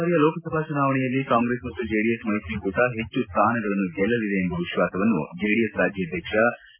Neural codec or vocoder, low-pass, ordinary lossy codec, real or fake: none; 3.6 kHz; MP3, 32 kbps; real